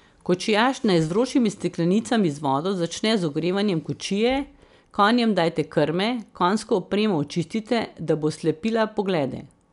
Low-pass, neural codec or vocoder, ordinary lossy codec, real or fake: 10.8 kHz; none; none; real